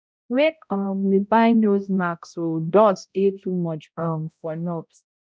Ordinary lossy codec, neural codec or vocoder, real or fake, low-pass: none; codec, 16 kHz, 0.5 kbps, X-Codec, HuBERT features, trained on balanced general audio; fake; none